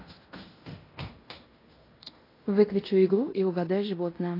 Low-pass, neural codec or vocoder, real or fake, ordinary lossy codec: 5.4 kHz; codec, 16 kHz in and 24 kHz out, 0.9 kbps, LongCat-Audio-Codec, fine tuned four codebook decoder; fake; AAC, 24 kbps